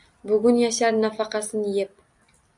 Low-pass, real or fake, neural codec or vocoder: 10.8 kHz; real; none